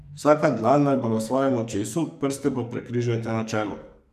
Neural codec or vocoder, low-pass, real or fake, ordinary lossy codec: codec, 44.1 kHz, 2.6 kbps, SNAC; 14.4 kHz; fake; none